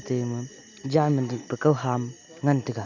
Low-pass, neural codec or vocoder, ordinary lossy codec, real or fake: 7.2 kHz; none; none; real